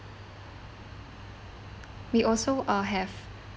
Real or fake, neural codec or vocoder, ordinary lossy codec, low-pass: real; none; none; none